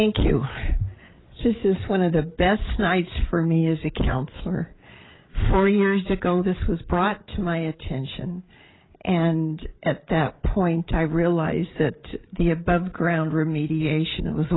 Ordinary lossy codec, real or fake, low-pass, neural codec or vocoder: AAC, 16 kbps; fake; 7.2 kHz; codec, 16 kHz, 8 kbps, FreqCodec, larger model